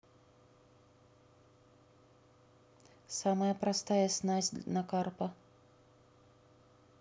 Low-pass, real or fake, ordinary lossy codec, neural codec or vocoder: none; real; none; none